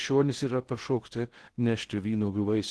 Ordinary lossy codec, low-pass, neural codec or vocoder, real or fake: Opus, 16 kbps; 10.8 kHz; codec, 16 kHz in and 24 kHz out, 0.6 kbps, FocalCodec, streaming, 2048 codes; fake